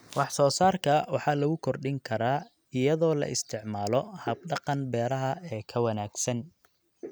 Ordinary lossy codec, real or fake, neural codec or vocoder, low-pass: none; real; none; none